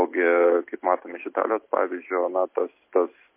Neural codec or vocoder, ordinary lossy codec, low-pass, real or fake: none; MP3, 24 kbps; 3.6 kHz; real